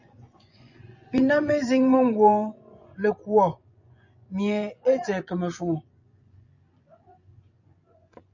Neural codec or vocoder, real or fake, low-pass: vocoder, 24 kHz, 100 mel bands, Vocos; fake; 7.2 kHz